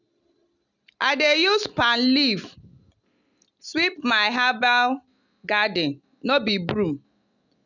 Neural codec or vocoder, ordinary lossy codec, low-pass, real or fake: none; none; 7.2 kHz; real